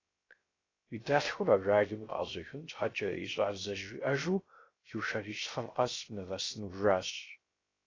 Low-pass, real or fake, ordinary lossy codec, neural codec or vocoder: 7.2 kHz; fake; AAC, 32 kbps; codec, 16 kHz, 0.3 kbps, FocalCodec